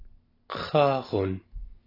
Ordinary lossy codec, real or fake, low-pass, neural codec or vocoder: AAC, 24 kbps; real; 5.4 kHz; none